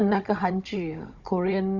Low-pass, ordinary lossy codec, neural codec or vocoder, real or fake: 7.2 kHz; Opus, 64 kbps; codec, 16 kHz, 16 kbps, FunCodec, trained on Chinese and English, 50 frames a second; fake